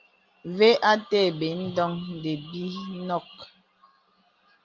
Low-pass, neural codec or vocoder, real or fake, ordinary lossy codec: 7.2 kHz; none; real; Opus, 32 kbps